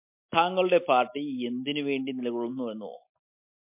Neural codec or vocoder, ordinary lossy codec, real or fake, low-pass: none; MP3, 32 kbps; real; 3.6 kHz